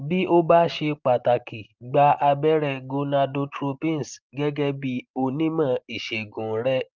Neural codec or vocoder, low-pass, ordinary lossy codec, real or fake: none; 7.2 kHz; Opus, 24 kbps; real